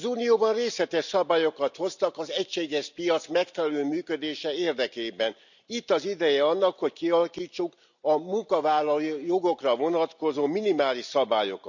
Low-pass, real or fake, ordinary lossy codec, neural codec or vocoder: 7.2 kHz; real; none; none